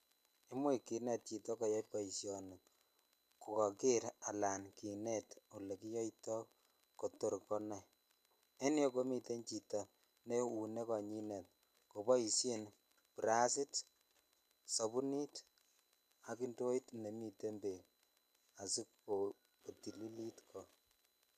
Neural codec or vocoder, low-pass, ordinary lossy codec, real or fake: none; 14.4 kHz; none; real